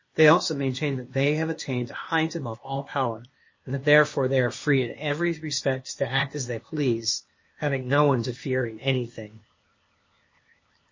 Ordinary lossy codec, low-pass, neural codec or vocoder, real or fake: MP3, 32 kbps; 7.2 kHz; codec, 16 kHz, 0.8 kbps, ZipCodec; fake